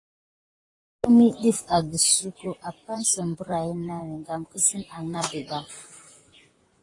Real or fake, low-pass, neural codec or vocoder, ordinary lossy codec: fake; 10.8 kHz; vocoder, 44.1 kHz, 128 mel bands, Pupu-Vocoder; AAC, 32 kbps